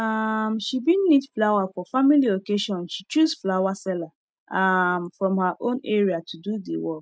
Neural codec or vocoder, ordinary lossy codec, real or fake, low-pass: none; none; real; none